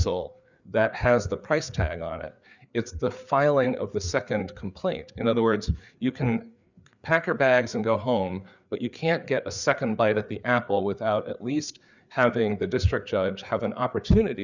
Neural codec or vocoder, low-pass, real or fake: codec, 16 kHz, 4 kbps, FreqCodec, larger model; 7.2 kHz; fake